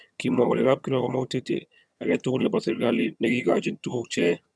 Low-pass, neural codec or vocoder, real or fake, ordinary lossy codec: none; vocoder, 22.05 kHz, 80 mel bands, HiFi-GAN; fake; none